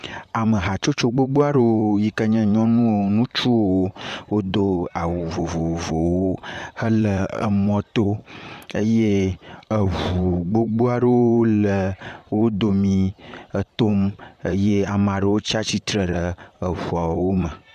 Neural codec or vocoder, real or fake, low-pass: vocoder, 44.1 kHz, 128 mel bands, Pupu-Vocoder; fake; 14.4 kHz